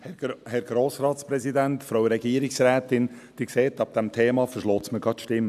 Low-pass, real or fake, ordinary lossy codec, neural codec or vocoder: 14.4 kHz; real; AAC, 96 kbps; none